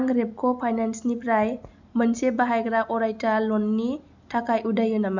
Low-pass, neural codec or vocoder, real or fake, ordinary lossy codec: 7.2 kHz; none; real; none